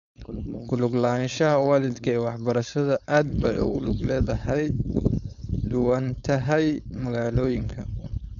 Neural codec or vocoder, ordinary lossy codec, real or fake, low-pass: codec, 16 kHz, 4.8 kbps, FACodec; none; fake; 7.2 kHz